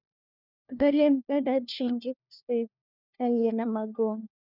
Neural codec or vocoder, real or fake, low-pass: codec, 16 kHz, 1 kbps, FunCodec, trained on LibriTTS, 50 frames a second; fake; 5.4 kHz